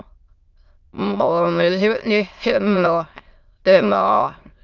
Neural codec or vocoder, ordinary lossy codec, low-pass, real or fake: autoencoder, 22.05 kHz, a latent of 192 numbers a frame, VITS, trained on many speakers; Opus, 24 kbps; 7.2 kHz; fake